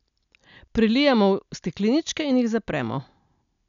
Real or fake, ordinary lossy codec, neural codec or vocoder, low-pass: real; none; none; 7.2 kHz